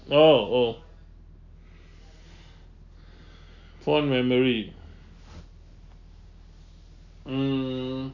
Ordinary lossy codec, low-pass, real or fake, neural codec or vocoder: none; 7.2 kHz; real; none